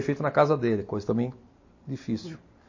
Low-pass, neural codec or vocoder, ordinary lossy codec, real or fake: 7.2 kHz; none; MP3, 32 kbps; real